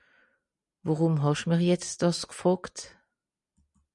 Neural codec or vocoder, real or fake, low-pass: none; real; 10.8 kHz